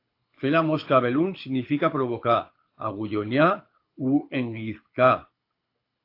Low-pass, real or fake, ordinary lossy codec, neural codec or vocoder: 5.4 kHz; fake; AAC, 32 kbps; codec, 24 kHz, 6 kbps, HILCodec